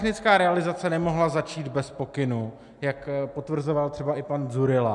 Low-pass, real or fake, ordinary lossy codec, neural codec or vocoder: 10.8 kHz; real; MP3, 96 kbps; none